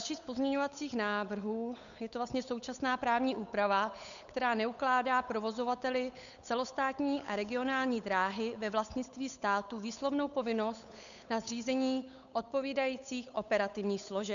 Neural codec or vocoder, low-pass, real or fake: codec, 16 kHz, 8 kbps, FunCodec, trained on Chinese and English, 25 frames a second; 7.2 kHz; fake